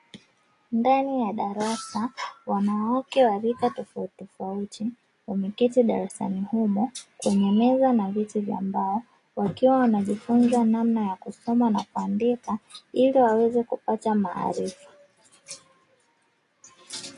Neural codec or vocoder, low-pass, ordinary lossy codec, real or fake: none; 10.8 kHz; AAC, 48 kbps; real